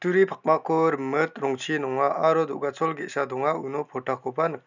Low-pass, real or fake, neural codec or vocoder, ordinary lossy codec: 7.2 kHz; real; none; none